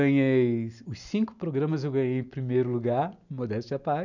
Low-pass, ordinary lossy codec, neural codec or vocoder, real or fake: 7.2 kHz; none; none; real